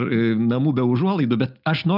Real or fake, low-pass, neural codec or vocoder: real; 5.4 kHz; none